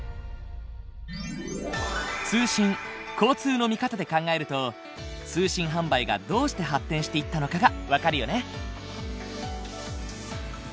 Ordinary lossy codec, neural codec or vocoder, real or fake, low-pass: none; none; real; none